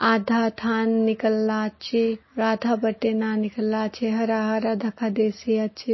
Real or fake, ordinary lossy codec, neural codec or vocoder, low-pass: real; MP3, 24 kbps; none; 7.2 kHz